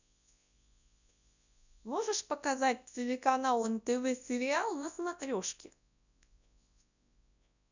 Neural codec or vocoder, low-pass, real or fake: codec, 24 kHz, 0.9 kbps, WavTokenizer, large speech release; 7.2 kHz; fake